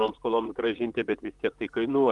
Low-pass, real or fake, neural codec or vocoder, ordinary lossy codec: 10.8 kHz; fake; vocoder, 44.1 kHz, 128 mel bands, Pupu-Vocoder; Opus, 32 kbps